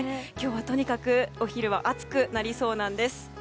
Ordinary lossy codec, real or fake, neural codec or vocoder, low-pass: none; real; none; none